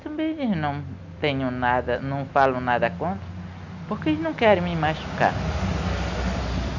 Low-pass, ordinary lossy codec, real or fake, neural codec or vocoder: 7.2 kHz; none; real; none